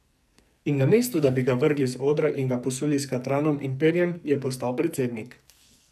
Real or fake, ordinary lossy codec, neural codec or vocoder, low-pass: fake; none; codec, 44.1 kHz, 2.6 kbps, SNAC; 14.4 kHz